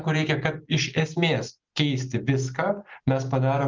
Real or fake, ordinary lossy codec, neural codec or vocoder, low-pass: real; Opus, 24 kbps; none; 7.2 kHz